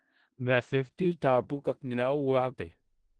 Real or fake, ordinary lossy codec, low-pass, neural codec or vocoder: fake; Opus, 16 kbps; 10.8 kHz; codec, 16 kHz in and 24 kHz out, 0.4 kbps, LongCat-Audio-Codec, four codebook decoder